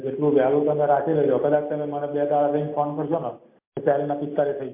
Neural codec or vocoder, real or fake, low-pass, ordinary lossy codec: none; real; 3.6 kHz; MP3, 32 kbps